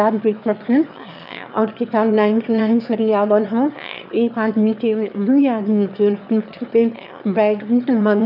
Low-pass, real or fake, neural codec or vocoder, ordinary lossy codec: 5.4 kHz; fake; autoencoder, 22.05 kHz, a latent of 192 numbers a frame, VITS, trained on one speaker; none